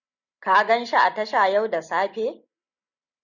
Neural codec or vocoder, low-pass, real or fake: none; 7.2 kHz; real